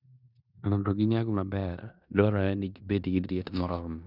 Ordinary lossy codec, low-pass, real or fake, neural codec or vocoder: none; 5.4 kHz; fake; codec, 16 kHz in and 24 kHz out, 0.9 kbps, LongCat-Audio-Codec, four codebook decoder